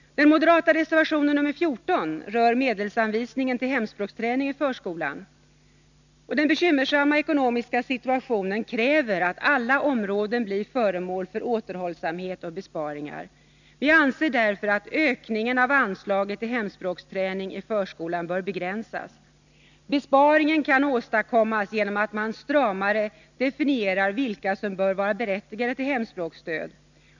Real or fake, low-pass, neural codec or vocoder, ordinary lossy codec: real; 7.2 kHz; none; none